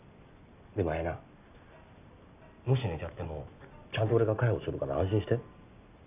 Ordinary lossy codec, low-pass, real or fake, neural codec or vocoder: none; 3.6 kHz; real; none